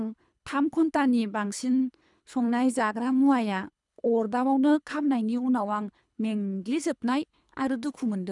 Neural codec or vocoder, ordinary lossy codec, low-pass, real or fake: codec, 24 kHz, 3 kbps, HILCodec; none; 10.8 kHz; fake